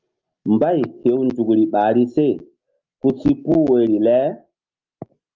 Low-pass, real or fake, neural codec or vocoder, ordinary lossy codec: 7.2 kHz; real; none; Opus, 24 kbps